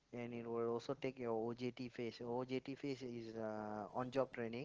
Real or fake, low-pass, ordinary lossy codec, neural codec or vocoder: real; 7.2 kHz; Opus, 16 kbps; none